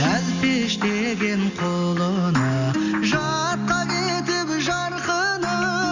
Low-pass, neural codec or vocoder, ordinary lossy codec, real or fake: 7.2 kHz; none; none; real